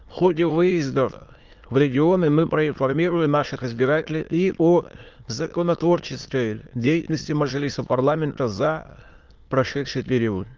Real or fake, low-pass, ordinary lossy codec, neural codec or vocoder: fake; 7.2 kHz; Opus, 24 kbps; autoencoder, 22.05 kHz, a latent of 192 numbers a frame, VITS, trained on many speakers